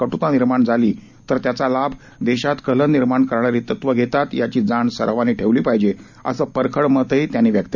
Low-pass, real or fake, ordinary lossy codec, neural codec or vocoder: 7.2 kHz; real; none; none